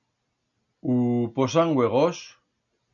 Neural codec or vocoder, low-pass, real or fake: none; 7.2 kHz; real